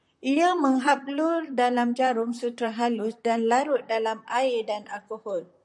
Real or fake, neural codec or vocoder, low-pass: fake; vocoder, 44.1 kHz, 128 mel bands, Pupu-Vocoder; 10.8 kHz